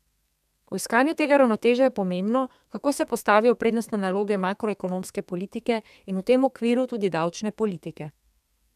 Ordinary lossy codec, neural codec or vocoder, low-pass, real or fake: none; codec, 32 kHz, 1.9 kbps, SNAC; 14.4 kHz; fake